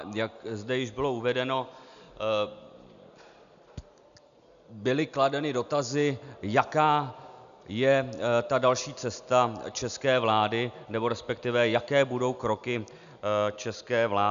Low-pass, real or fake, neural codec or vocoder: 7.2 kHz; real; none